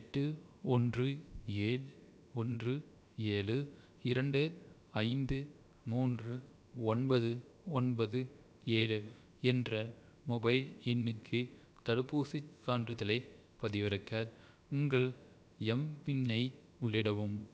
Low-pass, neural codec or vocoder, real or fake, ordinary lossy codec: none; codec, 16 kHz, about 1 kbps, DyCAST, with the encoder's durations; fake; none